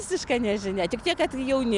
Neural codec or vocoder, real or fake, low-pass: none; real; 10.8 kHz